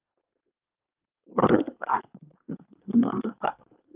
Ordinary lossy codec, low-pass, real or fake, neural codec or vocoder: Opus, 32 kbps; 3.6 kHz; fake; codec, 24 kHz, 1 kbps, SNAC